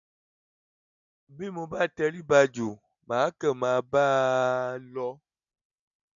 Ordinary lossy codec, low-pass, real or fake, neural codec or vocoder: none; 7.2 kHz; real; none